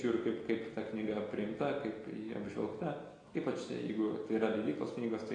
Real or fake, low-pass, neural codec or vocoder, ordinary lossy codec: real; 9.9 kHz; none; AAC, 32 kbps